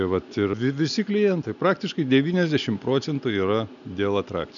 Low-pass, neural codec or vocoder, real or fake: 7.2 kHz; none; real